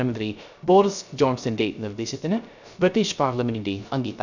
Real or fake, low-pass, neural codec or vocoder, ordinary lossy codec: fake; 7.2 kHz; codec, 16 kHz, 0.3 kbps, FocalCodec; none